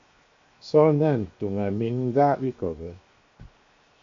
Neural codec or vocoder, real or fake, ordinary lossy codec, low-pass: codec, 16 kHz, 0.7 kbps, FocalCodec; fake; MP3, 48 kbps; 7.2 kHz